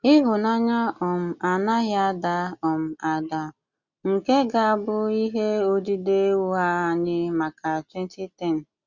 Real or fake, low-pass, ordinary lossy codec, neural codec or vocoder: real; 7.2 kHz; Opus, 64 kbps; none